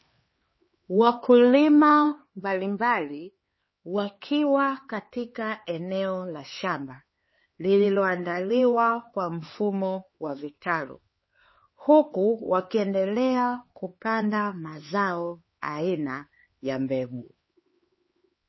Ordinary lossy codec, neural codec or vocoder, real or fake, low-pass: MP3, 24 kbps; codec, 16 kHz, 4 kbps, X-Codec, HuBERT features, trained on LibriSpeech; fake; 7.2 kHz